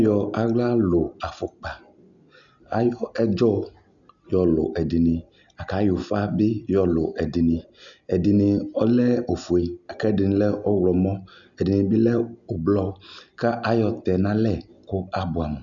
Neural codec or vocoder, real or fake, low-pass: none; real; 7.2 kHz